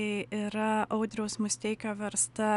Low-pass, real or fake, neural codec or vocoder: 10.8 kHz; real; none